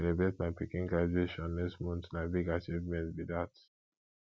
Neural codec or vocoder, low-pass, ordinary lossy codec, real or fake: none; none; none; real